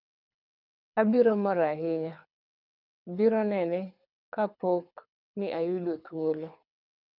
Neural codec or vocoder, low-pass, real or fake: codec, 24 kHz, 6 kbps, HILCodec; 5.4 kHz; fake